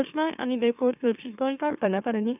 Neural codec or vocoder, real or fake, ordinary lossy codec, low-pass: autoencoder, 44.1 kHz, a latent of 192 numbers a frame, MeloTTS; fake; none; 3.6 kHz